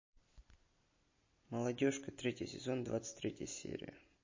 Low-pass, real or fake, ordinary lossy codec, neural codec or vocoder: 7.2 kHz; real; MP3, 32 kbps; none